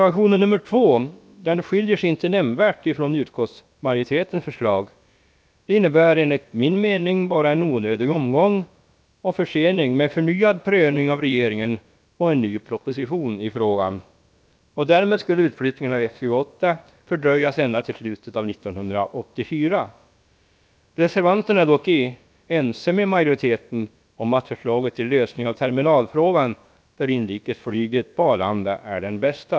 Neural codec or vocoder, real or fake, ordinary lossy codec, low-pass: codec, 16 kHz, about 1 kbps, DyCAST, with the encoder's durations; fake; none; none